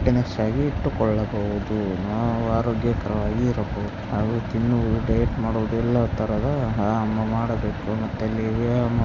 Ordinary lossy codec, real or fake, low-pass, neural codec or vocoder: none; real; 7.2 kHz; none